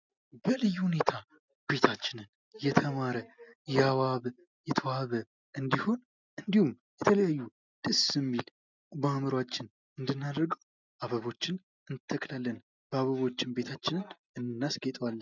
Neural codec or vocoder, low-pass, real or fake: none; 7.2 kHz; real